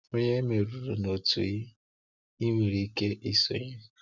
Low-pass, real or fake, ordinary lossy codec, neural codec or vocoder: 7.2 kHz; real; none; none